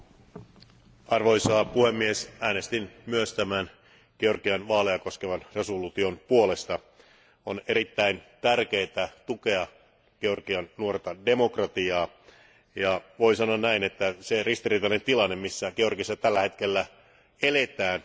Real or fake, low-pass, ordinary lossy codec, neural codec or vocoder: real; none; none; none